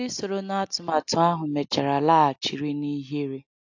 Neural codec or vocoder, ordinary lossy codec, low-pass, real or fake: none; AAC, 48 kbps; 7.2 kHz; real